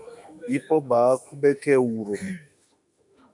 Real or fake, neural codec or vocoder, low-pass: fake; autoencoder, 48 kHz, 32 numbers a frame, DAC-VAE, trained on Japanese speech; 10.8 kHz